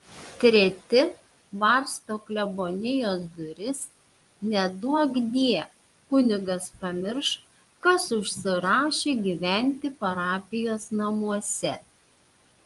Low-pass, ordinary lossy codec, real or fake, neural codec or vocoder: 10.8 kHz; Opus, 32 kbps; fake; vocoder, 24 kHz, 100 mel bands, Vocos